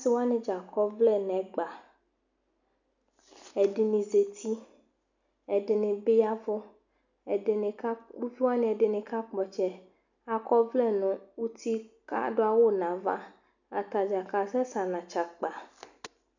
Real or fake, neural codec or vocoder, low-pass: real; none; 7.2 kHz